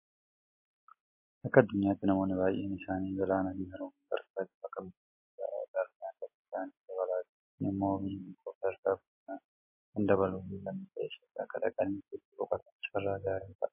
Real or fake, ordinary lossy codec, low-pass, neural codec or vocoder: real; MP3, 24 kbps; 3.6 kHz; none